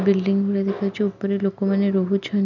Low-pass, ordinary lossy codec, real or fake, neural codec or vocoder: 7.2 kHz; none; real; none